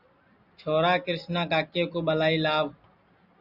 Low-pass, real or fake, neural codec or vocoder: 5.4 kHz; real; none